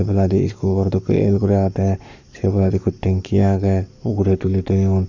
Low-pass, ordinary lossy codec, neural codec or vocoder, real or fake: 7.2 kHz; none; codec, 44.1 kHz, 7.8 kbps, Pupu-Codec; fake